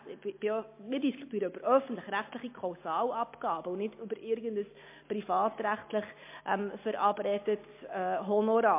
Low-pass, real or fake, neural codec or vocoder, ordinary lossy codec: 3.6 kHz; real; none; MP3, 24 kbps